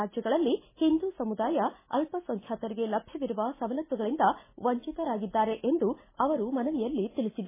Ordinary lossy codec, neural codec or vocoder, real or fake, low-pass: MP3, 16 kbps; none; real; 3.6 kHz